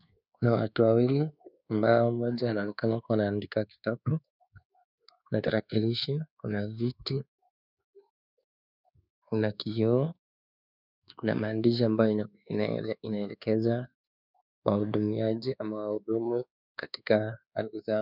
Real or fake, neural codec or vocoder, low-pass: fake; codec, 24 kHz, 1.2 kbps, DualCodec; 5.4 kHz